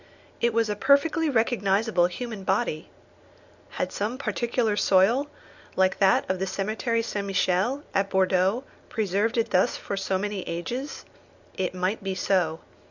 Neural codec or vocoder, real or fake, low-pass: none; real; 7.2 kHz